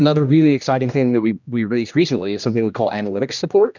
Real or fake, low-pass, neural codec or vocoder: fake; 7.2 kHz; codec, 16 kHz, 1 kbps, X-Codec, HuBERT features, trained on general audio